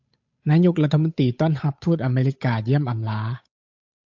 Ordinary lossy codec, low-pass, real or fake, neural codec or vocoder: AAC, 48 kbps; 7.2 kHz; fake; codec, 16 kHz, 8 kbps, FunCodec, trained on Chinese and English, 25 frames a second